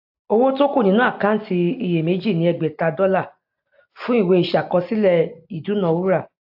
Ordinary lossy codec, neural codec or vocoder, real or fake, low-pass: AAC, 48 kbps; none; real; 5.4 kHz